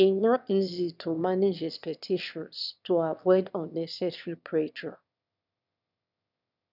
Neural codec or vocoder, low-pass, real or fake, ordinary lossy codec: autoencoder, 22.05 kHz, a latent of 192 numbers a frame, VITS, trained on one speaker; 5.4 kHz; fake; none